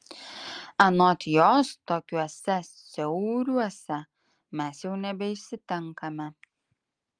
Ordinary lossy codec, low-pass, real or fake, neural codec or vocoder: Opus, 32 kbps; 9.9 kHz; real; none